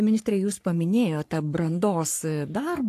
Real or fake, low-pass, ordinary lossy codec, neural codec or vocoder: fake; 14.4 kHz; AAC, 64 kbps; codec, 44.1 kHz, 3.4 kbps, Pupu-Codec